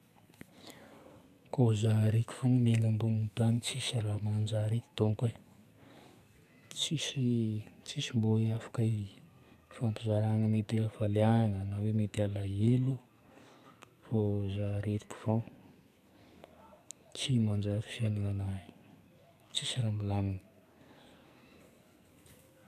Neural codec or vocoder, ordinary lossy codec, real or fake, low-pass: codec, 44.1 kHz, 2.6 kbps, SNAC; none; fake; 14.4 kHz